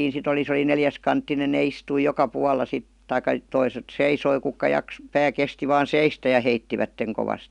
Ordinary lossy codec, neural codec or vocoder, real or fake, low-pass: none; none; real; 10.8 kHz